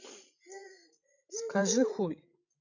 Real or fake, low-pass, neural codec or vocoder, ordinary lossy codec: fake; 7.2 kHz; codec, 16 kHz, 16 kbps, FreqCodec, larger model; none